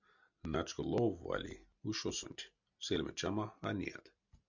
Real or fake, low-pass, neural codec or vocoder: real; 7.2 kHz; none